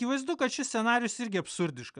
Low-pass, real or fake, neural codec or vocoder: 9.9 kHz; real; none